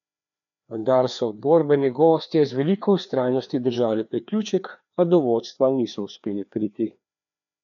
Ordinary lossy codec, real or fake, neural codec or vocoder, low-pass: none; fake; codec, 16 kHz, 2 kbps, FreqCodec, larger model; 7.2 kHz